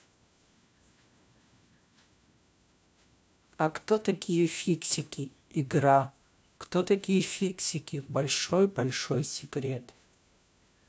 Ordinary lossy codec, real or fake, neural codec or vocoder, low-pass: none; fake; codec, 16 kHz, 1 kbps, FunCodec, trained on LibriTTS, 50 frames a second; none